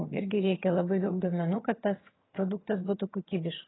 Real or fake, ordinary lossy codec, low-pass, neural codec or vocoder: fake; AAC, 16 kbps; 7.2 kHz; vocoder, 22.05 kHz, 80 mel bands, HiFi-GAN